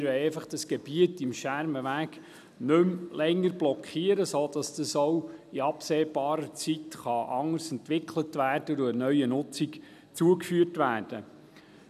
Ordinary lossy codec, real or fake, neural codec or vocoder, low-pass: none; real; none; 14.4 kHz